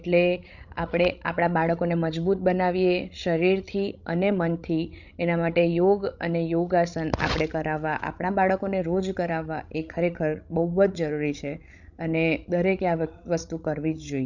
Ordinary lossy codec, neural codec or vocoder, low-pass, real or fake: none; codec, 16 kHz, 16 kbps, FreqCodec, larger model; 7.2 kHz; fake